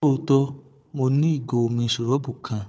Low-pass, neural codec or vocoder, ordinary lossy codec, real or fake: none; codec, 16 kHz, 4 kbps, FunCodec, trained on Chinese and English, 50 frames a second; none; fake